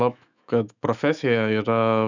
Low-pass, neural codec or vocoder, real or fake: 7.2 kHz; autoencoder, 48 kHz, 128 numbers a frame, DAC-VAE, trained on Japanese speech; fake